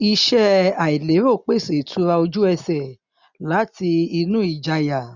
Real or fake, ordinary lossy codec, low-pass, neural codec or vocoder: real; none; 7.2 kHz; none